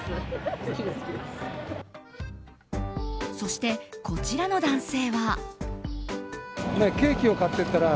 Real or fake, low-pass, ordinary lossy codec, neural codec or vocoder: real; none; none; none